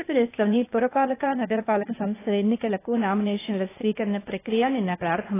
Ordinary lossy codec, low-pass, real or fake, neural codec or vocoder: AAC, 16 kbps; 3.6 kHz; fake; codec, 16 kHz, 0.8 kbps, ZipCodec